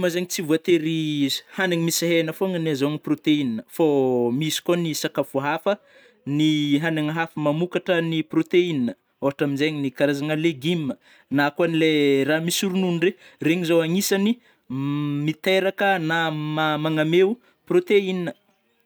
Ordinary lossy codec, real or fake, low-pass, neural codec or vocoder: none; real; none; none